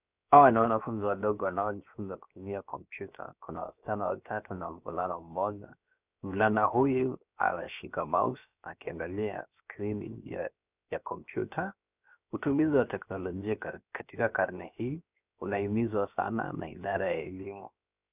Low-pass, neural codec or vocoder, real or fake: 3.6 kHz; codec, 16 kHz, 0.7 kbps, FocalCodec; fake